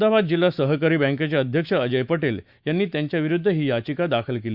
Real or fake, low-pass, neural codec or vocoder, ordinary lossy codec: fake; 5.4 kHz; autoencoder, 48 kHz, 128 numbers a frame, DAC-VAE, trained on Japanese speech; Opus, 64 kbps